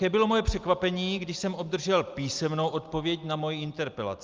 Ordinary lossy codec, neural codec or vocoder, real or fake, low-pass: Opus, 24 kbps; none; real; 7.2 kHz